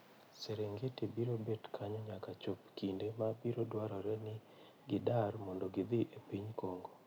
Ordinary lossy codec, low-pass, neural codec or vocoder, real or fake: none; none; none; real